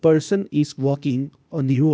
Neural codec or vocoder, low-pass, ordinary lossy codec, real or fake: codec, 16 kHz, 0.8 kbps, ZipCodec; none; none; fake